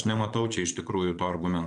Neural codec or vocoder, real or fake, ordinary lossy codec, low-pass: vocoder, 22.05 kHz, 80 mel bands, Vocos; fake; MP3, 64 kbps; 9.9 kHz